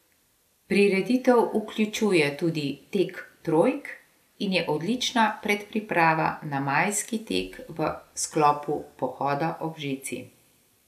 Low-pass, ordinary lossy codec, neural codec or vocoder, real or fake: 14.4 kHz; none; none; real